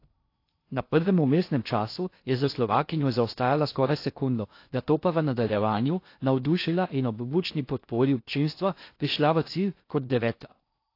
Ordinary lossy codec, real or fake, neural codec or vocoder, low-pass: AAC, 32 kbps; fake; codec, 16 kHz in and 24 kHz out, 0.6 kbps, FocalCodec, streaming, 4096 codes; 5.4 kHz